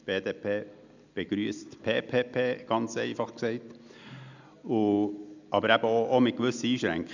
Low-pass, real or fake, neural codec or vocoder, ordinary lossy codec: 7.2 kHz; real; none; none